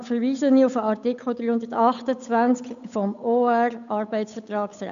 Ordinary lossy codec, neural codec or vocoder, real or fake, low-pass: none; codec, 16 kHz, 8 kbps, FunCodec, trained on Chinese and English, 25 frames a second; fake; 7.2 kHz